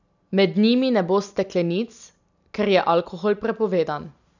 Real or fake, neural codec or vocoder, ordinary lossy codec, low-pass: real; none; none; 7.2 kHz